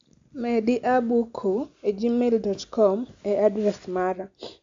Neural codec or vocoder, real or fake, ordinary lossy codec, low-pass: none; real; AAC, 64 kbps; 7.2 kHz